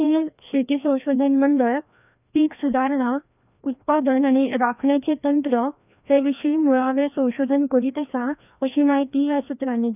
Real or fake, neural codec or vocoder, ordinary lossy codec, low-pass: fake; codec, 16 kHz, 1 kbps, FreqCodec, larger model; none; 3.6 kHz